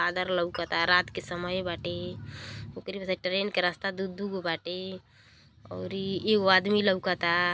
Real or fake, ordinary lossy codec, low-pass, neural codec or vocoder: real; none; none; none